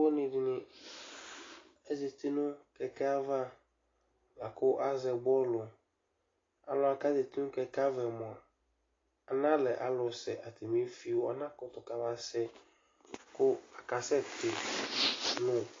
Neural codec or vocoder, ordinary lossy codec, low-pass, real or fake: none; AAC, 32 kbps; 7.2 kHz; real